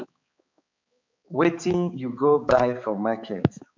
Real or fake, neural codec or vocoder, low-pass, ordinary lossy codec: fake; codec, 16 kHz, 4 kbps, X-Codec, HuBERT features, trained on balanced general audio; 7.2 kHz; MP3, 64 kbps